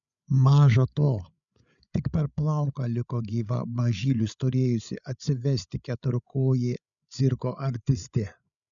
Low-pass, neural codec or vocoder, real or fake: 7.2 kHz; codec, 16 kHz, 8 kbps, FreqCodec, larger model; fake